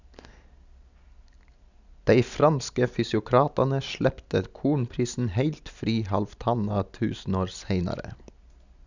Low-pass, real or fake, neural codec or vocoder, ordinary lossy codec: 7.2 kHz; real; none; none